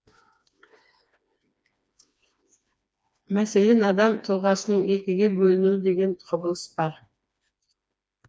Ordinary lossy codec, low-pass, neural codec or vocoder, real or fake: none; none; codec, 16 kHz, 2 kbps, FreqCodec, smaller model; fake